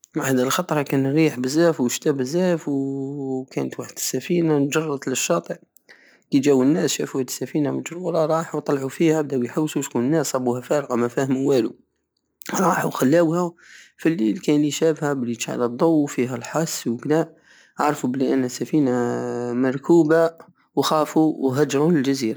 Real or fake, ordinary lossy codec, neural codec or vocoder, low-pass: real; none; none; none